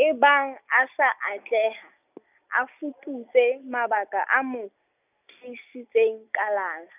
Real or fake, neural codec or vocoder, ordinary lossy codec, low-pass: real; none; none; 3.6 kHz